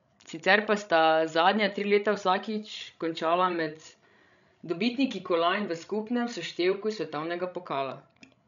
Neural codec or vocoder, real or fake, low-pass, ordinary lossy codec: codec, 16 kHz, 16 kbps, FreqCodec, larger model; fake; 7.2 kHz; none